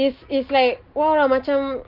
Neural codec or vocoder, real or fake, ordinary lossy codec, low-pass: none; real; Opus, 32 kbps; 5.4 kHz